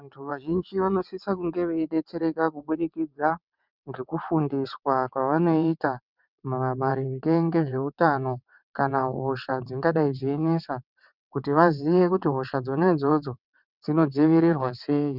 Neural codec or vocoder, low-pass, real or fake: vocoder, 22.05 kHz, 80 mel bands, WaveNeXt; 5.4 kHz; fake